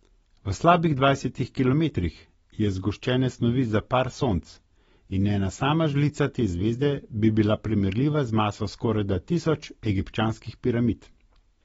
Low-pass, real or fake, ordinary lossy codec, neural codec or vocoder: 9.9 kHz; real; AAC, 24 kbps; none